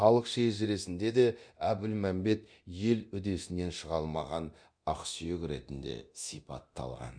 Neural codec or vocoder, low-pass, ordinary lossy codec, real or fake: codec, 24 kHz, 0.9 kbps, DualCodec; 9.9 kHz; none; fake